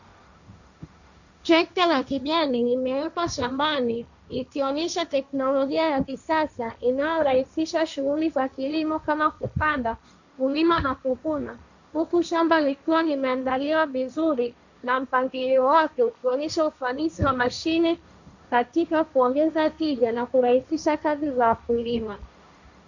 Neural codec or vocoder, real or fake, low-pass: codec, 16 kHz, 1.1 kbps, Voila-Tokenizer; fake; 7.2 kHz